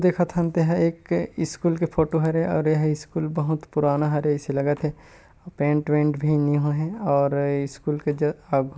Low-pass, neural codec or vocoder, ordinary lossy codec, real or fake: none; none; none; real